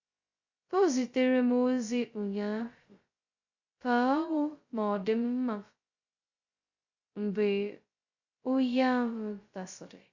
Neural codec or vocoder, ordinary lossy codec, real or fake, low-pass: codec, 16 kHz, 0.2 kbps, FocalCodec; Opus, 64 kbps; fake; 7.2 kHz